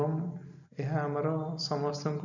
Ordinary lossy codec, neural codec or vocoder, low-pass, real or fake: none; none; 7.2 kHz; real